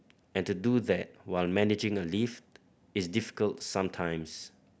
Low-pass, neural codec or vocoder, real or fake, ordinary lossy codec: none; none; real; none